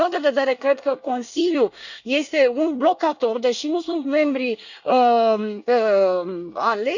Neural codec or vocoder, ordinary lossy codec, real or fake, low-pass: codec, 24 kHz, 1 kbps, SNAC; none; fake; 7.2 kHz